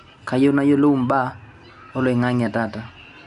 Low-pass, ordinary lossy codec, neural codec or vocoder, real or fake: 10.8 kHz; none; none; real